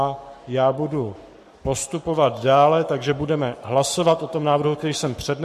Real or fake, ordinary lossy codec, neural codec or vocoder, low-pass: fake; MP3, 64 kbps; codec, 44.1 kHz, 7.8 kbps, Pupu-Codec; 14.4 kHz